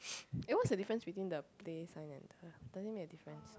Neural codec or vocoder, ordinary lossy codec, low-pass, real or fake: none; none; none; real